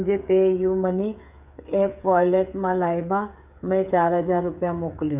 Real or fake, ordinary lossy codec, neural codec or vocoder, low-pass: fake; none; codec, 16 kHz, 8 kbps, FreqCodec, smaller model; 3.6 kHz